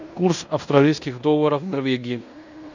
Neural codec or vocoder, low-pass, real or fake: codec, 16 kHz in and 24 kHz out, 0.9 kbps, LongCat-Audio-Codec, fine tuned four codebook decoder; 7.2 kHz; fake